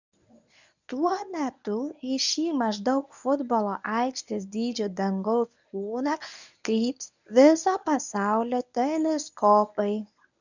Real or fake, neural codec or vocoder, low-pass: fake; codec, 24 kHz, 0.9 kbps, WavTokenizer, medium speech release version 1; 7.2 kHz